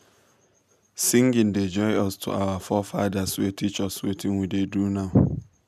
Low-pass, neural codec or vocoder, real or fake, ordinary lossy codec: 14.4 kHz; none; real; none